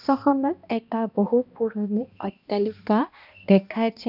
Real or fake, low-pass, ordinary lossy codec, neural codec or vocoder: fake; 5.4 kHz; none; codec, 16 kHz, 1 kbps, X-Codec, HuBERT features, trained on balanced general audio